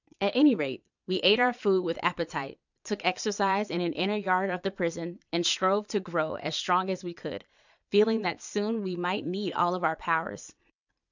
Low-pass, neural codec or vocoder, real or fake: 7.2 kHz; vocoder, 22.05 kHz, 80 mel bands, Vocos; fake